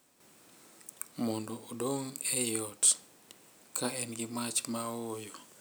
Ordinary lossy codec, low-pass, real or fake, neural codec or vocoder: none; none; real; none